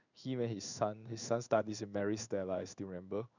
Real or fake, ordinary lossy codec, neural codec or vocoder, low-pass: fake; none; codec, 16 kHz in and 24 kHz out, 1 kbps, XY-Tokenizer; 7.2 kHz